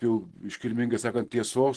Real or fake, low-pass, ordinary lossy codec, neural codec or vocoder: fake; 10.8 kHz; Opus, 16 kbps; vocoder, 44.1 kHz, 128 mel bands every 512 samples, BigVGAN v2